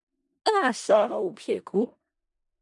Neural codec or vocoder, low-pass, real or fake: codec, 16 kHz in and 24 kHz out, 0.4 kbps, LongCat-Audio-Codec, four codebook decoder; 10.8 kHz; fake